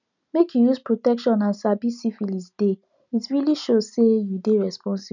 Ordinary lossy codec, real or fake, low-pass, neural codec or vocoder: none; real; 7.2 kHz; none